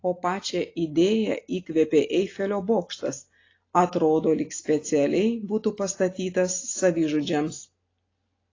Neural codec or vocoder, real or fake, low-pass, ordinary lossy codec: none; real; 7.2 kHz; AAC, 32 kbps